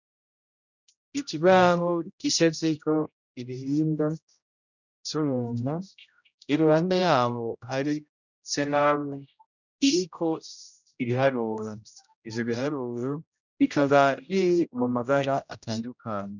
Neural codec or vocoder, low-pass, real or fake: codec, 16 kHz, 0.5 kbps, X-Codec, HuBERT features, trained on general audio; 7.2 kHz; fake